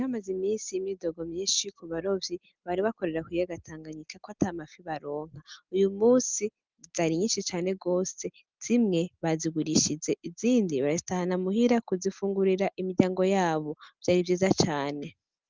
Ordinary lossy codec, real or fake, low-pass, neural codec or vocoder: Opus, 32 kbps; real; 7.2 kHz; none